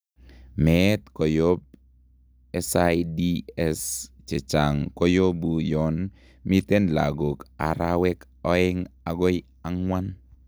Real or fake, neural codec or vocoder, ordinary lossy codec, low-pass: real; none; none; none